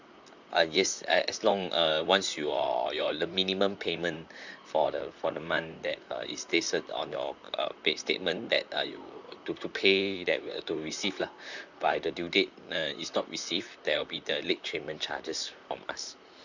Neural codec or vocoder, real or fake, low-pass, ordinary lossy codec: vocoder, 44.1 kHz, 128 mel bands, Pupu-Vocoder; fake; 7.2 kHz; none